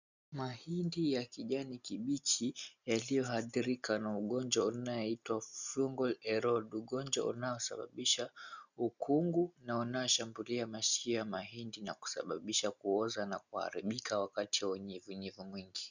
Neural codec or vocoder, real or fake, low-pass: none; real; 7.2 kHz